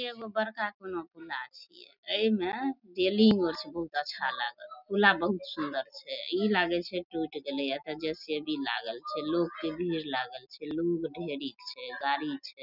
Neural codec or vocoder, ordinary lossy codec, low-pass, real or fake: none; none; 5.4 kHz; real